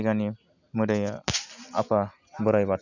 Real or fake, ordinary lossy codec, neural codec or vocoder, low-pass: real; none; none; 7.2 kHz